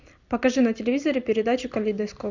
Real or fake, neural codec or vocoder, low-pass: real; none; 7.2 kHz